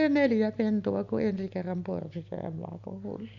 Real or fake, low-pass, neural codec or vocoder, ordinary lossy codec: real; 7.2 kHz; none; none